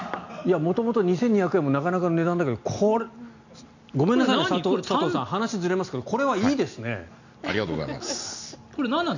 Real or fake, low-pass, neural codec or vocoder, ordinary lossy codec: real; 7.2 kHz; none; none